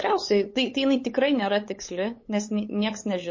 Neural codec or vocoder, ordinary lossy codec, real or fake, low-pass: codec, 16 kHz, 8 kbps, FunCodec, trained on LibriTTS, 25 frames a second; MP3, 32 kbps; fake; 7.2 kHz